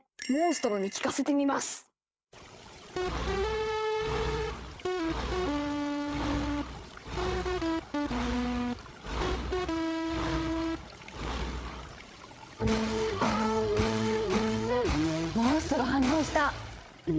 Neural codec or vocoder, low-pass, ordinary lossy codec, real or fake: codec, 16 kHz, 8 kbps, FreqCodec, larger model; none; none; fake